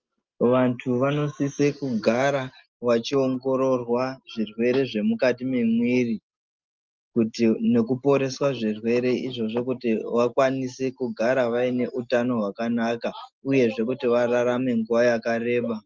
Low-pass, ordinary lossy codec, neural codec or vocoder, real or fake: 7.2 kHz; Opus, 32 kbps; none; real